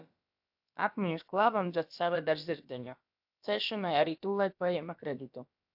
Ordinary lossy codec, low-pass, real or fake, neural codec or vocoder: AAC, 48 kbps; 5.4 kHz; fake; codec, 16 kHz, about 1 kbps, DyCAST, with the encoder's durations